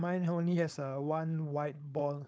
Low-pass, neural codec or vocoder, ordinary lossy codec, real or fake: none; codec, 16 kHz, 4.8 kbps, FACodec; none; fake